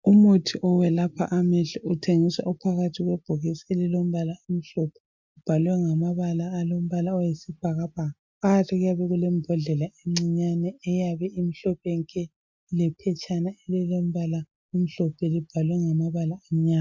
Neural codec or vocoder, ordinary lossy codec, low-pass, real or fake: none; MP3, 64 kbps; 7.2 kHz; real